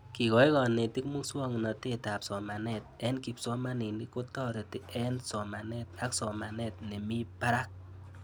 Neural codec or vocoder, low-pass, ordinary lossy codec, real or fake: none; none; none; real